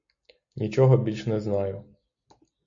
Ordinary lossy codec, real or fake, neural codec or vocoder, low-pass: AAC, 64 kbps; real; none; 7.2 kHz